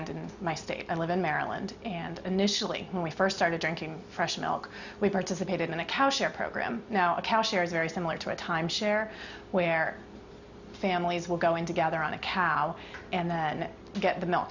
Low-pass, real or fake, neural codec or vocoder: 7.2 kHz; real; none